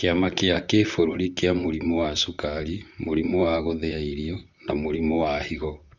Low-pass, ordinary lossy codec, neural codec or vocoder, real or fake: 7.2 kHz; none; vocoder, 44.1 kHz, 128 mel bands, Pupu-Vocoder; fake